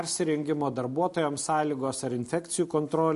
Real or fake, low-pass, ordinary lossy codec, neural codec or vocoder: real; 14.4 kHz; MP3, 48 kbps; none